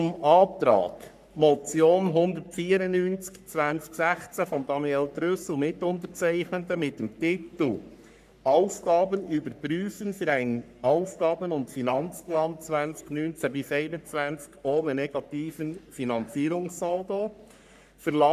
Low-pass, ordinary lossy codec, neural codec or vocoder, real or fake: 14.4 kHz; none; codec, 44.1 kHz, 3.4 kbps, Pupu-Codec; fake